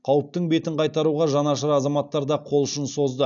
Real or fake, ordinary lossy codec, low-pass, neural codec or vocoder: real; none; 7.2 kHz; none